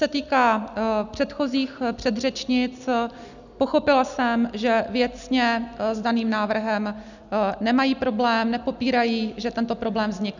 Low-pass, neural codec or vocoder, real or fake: 7.2 kHz; none; real